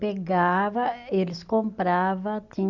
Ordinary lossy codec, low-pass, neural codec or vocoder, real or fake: Opus, 64 kbps; 7.2 kHz; none; real